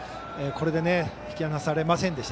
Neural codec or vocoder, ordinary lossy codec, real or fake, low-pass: none; none; real; none